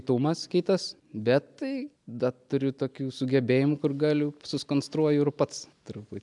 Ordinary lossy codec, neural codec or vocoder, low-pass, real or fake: MP3, 96 kbps; none; 10.8 kHz; real